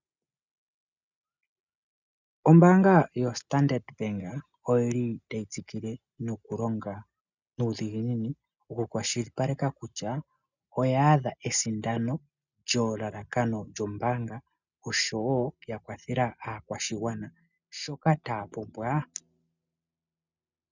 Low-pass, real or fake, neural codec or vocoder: 7.2 kHz; real; none